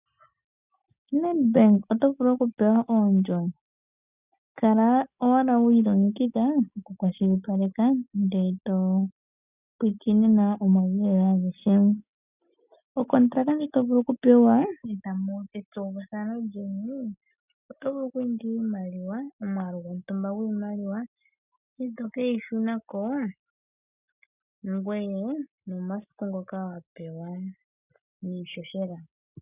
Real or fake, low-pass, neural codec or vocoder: real; 3.6 kHz; none